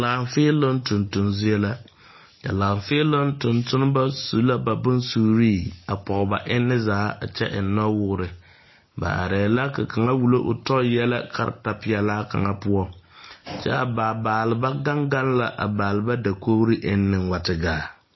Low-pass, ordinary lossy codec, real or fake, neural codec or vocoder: 7.2 kHz; MP3, 24 kbps; real; none